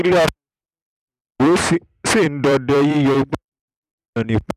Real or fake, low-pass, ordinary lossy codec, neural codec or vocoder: fake; 14.4 kHz; none; vocoder, 44.1 kHz, 128 mel bands every 256 samples, BigVGAN v2